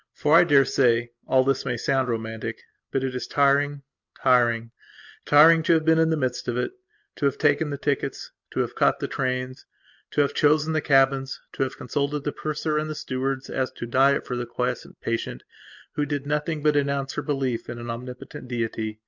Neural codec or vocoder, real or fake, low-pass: none; real; 7.2 kHz